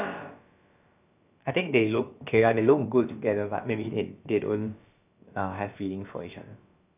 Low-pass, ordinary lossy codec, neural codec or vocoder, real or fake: 3.6 kHz; none; codec, 16 kHz, about 1 kbps, DyCAST, with the encoder's durations; fake